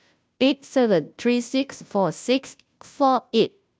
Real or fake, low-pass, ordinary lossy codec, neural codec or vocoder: fake; none; none; codec, 16 kHz, 0.5 kbps, FunCodec, trained on Chinese and English, 25 frames a second